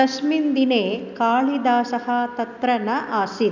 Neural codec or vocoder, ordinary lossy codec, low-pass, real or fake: none; none; 7.2 kHz; real